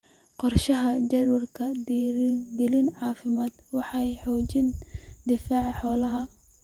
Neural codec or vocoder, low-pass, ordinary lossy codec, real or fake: vocoder, 48 kHz, 128 mel bands, Vocos; 19.8 kHz; Opus, 32 kbps; fake